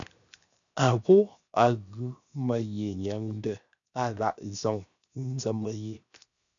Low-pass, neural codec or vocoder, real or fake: 7.2 kHz; codec, 16 kHz, 0.7 kbps, FocalCodec; fake